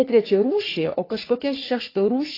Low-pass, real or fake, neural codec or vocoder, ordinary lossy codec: 5.4 kHz; fake; codec, 44.1 kHz, 3.4 kbps, Pupu-Codec; AAC, 24 kbps